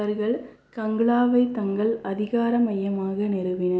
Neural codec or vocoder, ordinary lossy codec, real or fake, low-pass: none; none; real; none